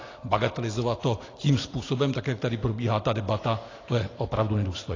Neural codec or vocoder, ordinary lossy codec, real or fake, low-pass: none; AAC, 32 kbps; real; 7.2 kHz